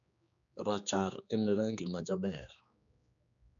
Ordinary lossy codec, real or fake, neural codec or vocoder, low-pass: none; fake; codec, 16 kHz, 2 kbps, X-Codec, HuBERT features, trained on general audio; 7.2 kHz